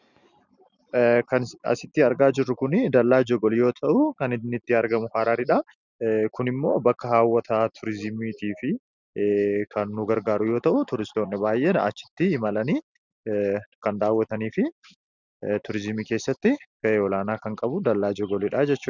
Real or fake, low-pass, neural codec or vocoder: real; 7.2 kHz; none